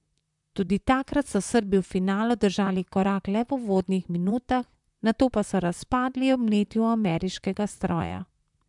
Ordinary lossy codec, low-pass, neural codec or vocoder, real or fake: MP3, 96 kbps; 10.8 kHz; vocoder, 44.1 kHz, 128 mel bands, Pupu-Vocoder; fake